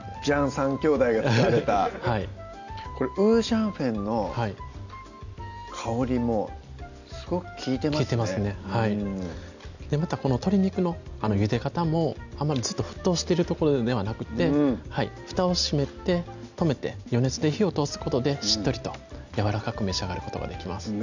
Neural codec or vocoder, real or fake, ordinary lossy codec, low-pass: none; real; none; 7.2 kHz